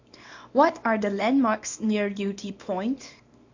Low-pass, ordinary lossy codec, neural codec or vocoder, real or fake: 7.2 kHz; AAC, 48 kbps; codec, 24 kHz, 0.9 kbps, WavTokenizer, small release; fake